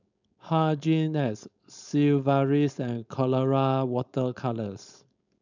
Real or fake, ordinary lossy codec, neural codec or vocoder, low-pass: fake; none; codec, 16 kHz, 4.8 kbps, FACodec; 7.2 kHz